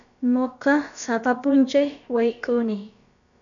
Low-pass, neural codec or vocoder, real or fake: 7.2 kHz; codec, 16 kHz, about 1 kbps, DyCAST, with the encoder's durations; fake